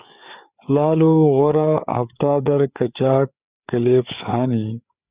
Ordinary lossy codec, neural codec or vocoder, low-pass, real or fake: Opus, 64 kbps; codec, 16 kHz, 4 kbps, FreqCodec, larger model; 3.6 kHz; fake